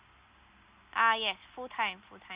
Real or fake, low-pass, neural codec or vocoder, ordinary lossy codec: real; 3.6 kHz; none; Opus, 64 kbps